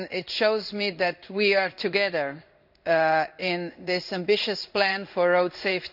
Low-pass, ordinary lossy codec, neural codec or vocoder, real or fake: 5.4 kHz; none; codec, 16 kHz in and 24 kHz out, 1 kbps, XY-Tokenizer; fake